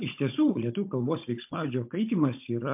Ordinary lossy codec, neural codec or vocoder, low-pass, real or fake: MP3, 32 kbps; codec, 16 kHz, 16 kbps, FunCodec, trained on Chinese and English, 50 frames a second; 3.6 kHz; fake